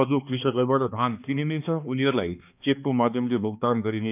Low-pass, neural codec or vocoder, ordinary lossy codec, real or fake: 3.6 kHz; codec, 16 kHz, 2 kbps, X-Codec, HuBERT features, trained on balanced general audio; none; fake